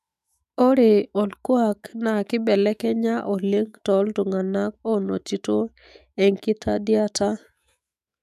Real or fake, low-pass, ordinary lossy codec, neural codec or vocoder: fake; 14.4 kHz; none; autoencoder, 48 kHz, 128 numbers a frame, DAC-VAE, trained on Japanese speech